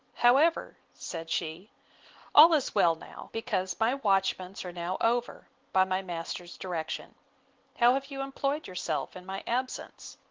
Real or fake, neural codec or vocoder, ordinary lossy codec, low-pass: real; none; Opus, 16 kbps; 7.2 kHz